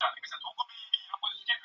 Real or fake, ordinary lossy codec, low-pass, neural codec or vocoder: real; Opus, 64 kbps; 7.2 kHz; none